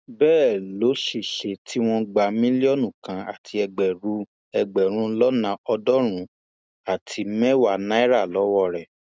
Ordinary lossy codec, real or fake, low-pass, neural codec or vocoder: none; real; none; none